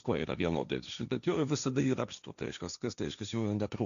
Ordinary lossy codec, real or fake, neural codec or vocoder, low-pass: MP3, 96 kbps; fake; codec, 16 kHz, 1.1 kbps, Voila-Tokenizer; 7.2 kHz